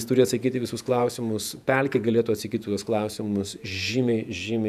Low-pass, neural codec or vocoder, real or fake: 14.4 kHz; vocoder, 48 kHz, 128 mel bands, Vocos; fake